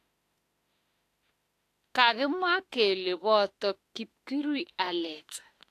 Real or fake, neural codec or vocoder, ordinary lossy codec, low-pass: fake; autoencoder, 48 kHz, 32 numbers a frame, DAC-VAE, trained on Japanese speech; AAC, 64 kbps; 14.4 kHz